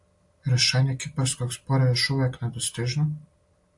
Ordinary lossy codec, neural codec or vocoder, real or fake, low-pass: AAC, 64 kbps; none; real; 10.8 kHz